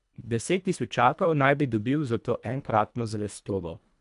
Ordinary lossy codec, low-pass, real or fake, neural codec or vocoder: none; 10.8 kHz; fake; codec, 24 kHz, 1.5 kbps, HILCodec